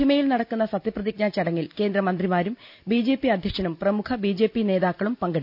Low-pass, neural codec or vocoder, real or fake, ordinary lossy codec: 5.4 kHz; none; real; none